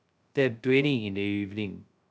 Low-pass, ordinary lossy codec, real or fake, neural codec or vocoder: none; none; fake; codec, 16 kHz, 0.2 kbps, FocalCodec